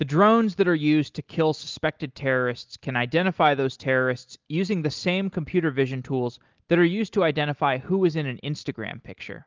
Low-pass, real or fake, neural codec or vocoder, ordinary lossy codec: 7.2 kHz; real; none; Opus, 32 kbps